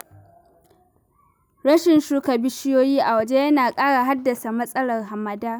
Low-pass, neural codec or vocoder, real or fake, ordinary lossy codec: none; none; real; none